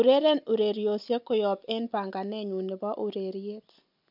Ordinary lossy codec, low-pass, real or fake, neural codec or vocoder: MP3, 48 kbps; 5.4 kHz; real; none